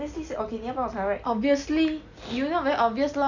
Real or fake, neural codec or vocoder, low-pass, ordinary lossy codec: real; none; 7.2 kHz; none